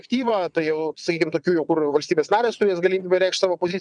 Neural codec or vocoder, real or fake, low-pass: vocoder, 22.05 kHz, 80 mel bands, WaveNeXt; fake; 9.9 kHz